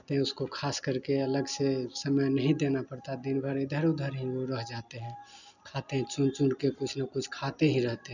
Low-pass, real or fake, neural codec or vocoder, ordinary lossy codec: 7.2 kHz; real; none; none